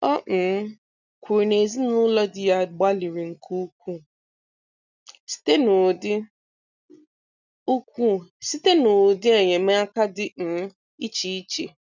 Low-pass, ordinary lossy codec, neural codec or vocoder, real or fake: 7.2 kHz; none; none; real